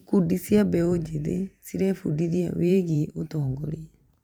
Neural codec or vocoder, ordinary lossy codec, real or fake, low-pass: vocoder, 44.1 kHz, 128 mel bands every 512 samples, BigVGAN v2; none; fake; 19.8 kHz